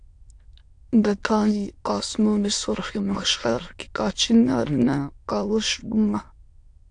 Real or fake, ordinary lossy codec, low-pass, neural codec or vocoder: fake; AAC, 64 kbps; 9.9 kHz; autoencoder, 22.05 kHz, a latent of 192 numbers a frame, VITS, trained on many speakers